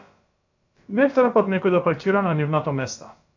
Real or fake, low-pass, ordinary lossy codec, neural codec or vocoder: fake; 7.2 kHz; AAC, 48 kbps; codec, 16 kHz, about 1 kbps, DyCAST, with the encoder's durations